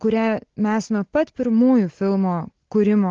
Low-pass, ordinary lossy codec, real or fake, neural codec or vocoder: 7.2 kHz; Opus, 16 kbps; real; none